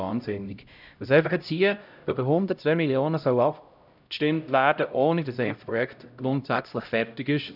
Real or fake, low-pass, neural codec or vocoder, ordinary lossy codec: fake; 5.4 kHz; codec, 16 kHz, 0.5 kbps, X-Codec, HuBERT features, trained on LibriSpeech; none